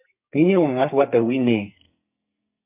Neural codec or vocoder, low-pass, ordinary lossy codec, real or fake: codec, 44.1 kHz, 2.6 kbps, SNAC; 3.6 kHz; MP3, 32 kbps; fake